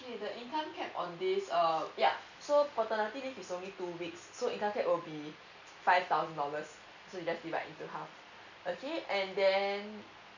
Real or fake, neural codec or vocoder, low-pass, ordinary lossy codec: real; none; 7.2 kHz; none